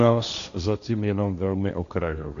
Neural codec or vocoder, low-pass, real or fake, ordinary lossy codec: codec, 16 kHz, 1.1 kbps, Voila-Tokenizer; 7.2 kHz; fake; AAC, 96 kbps